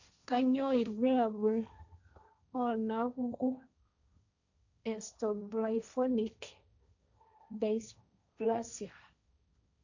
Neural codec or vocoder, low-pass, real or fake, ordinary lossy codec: codec, 16 kHz, 1.1 kbps, Voila-Tokenizer; 7.2 kHz; fake; none